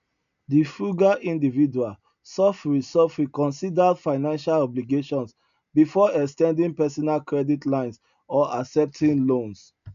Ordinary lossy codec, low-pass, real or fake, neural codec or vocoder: none; 7.2 kHz; real; none